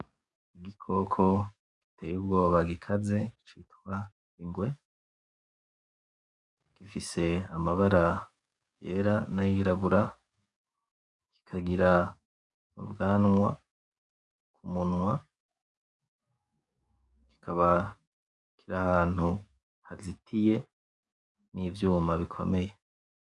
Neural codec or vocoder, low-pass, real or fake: codec, 44.1 kHz, 7.8 kbps, DAC; 10.8 kHz; fake